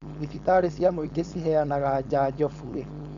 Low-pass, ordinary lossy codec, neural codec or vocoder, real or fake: 7.2 kHz; none; codec, 16 kHz, 4.8 kbps, FACodec; fake